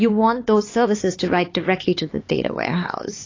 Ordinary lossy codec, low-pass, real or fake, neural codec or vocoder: AAC, 32 kbps; 7.2 kHz; fake; codec, 16 kHz, 4 kbps, X-Codec, HuBERT features, trained on LibriSpeech